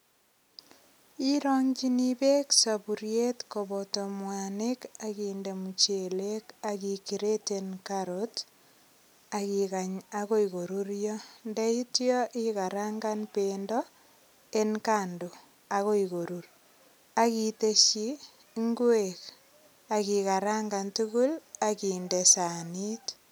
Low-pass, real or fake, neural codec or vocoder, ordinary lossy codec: none; real; none; none